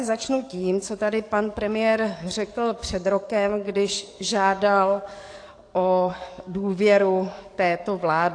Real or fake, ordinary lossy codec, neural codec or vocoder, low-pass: fake; AAC, 48 kbps; codec, 44.1 kHz, 7.8 kbps, DAC; 9.9 kHz